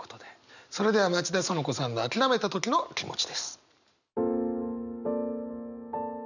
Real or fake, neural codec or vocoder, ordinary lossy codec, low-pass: real; none; none; 7.2 kHz